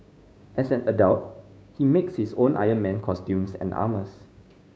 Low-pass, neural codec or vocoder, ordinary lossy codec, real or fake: none; codec, 16 kHz, 6 kbps, DAC; none; fake